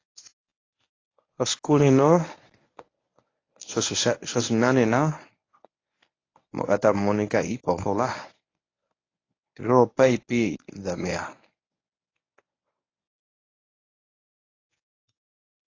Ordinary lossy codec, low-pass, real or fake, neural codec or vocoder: AAC, 32 kbps; 7.2 kHz; fake; codec, 24 kHz, 0.9 kbps, WavTokenizer, medium speech release version 1